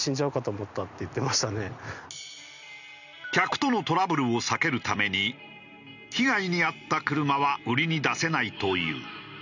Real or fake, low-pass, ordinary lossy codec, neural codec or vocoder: real; 7.2 kHz; none; none